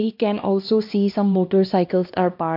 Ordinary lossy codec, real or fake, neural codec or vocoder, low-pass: MP3, 48 kbps; fake; codec, 16 kHz, 1 kbps, X-Codec, HuBERT features, trained on LibriSpeech; 5.4 kHz